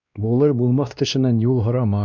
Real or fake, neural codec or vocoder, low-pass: fake; codec, 16 kHz, 1 kbps, X-Codec, WavLM features, trained on Multilingual LibriSpeech; 7.2 kHz